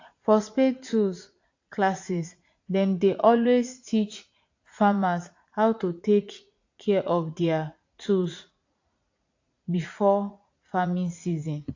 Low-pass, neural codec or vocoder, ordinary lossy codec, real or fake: 7.2 kHz; vocoder, 44.1 kHz, 80 mel bands, Vocos; AAC, 48 kbps; fake